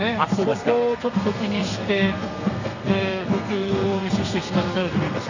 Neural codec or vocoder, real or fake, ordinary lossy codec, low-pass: codec, 32 kHz, 1.9 kbps, SNAC; fake; none; 7.2 kHz